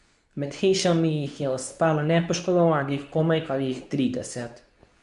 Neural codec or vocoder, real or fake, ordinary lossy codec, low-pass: codec, 24 kHz, 0.9 kbps, WavTokenizer, medium speech release version 2; fake; none; 10.8 kHz